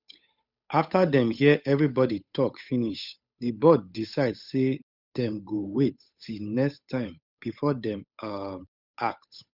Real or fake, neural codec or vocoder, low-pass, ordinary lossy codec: fake; codec, 16 kHz, 8 kbps, FunCodec, trained on Chinese and English, 25 frames a second; 5.4 kHz; none